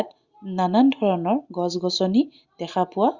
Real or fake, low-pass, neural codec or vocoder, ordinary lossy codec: real; 7.2 kHz; none; Opus, 64 kbps